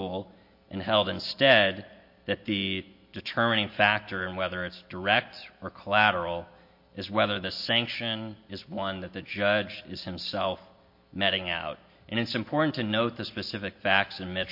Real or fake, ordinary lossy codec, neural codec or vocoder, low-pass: real; MP3, 32 kbps; none; 5.4 kHz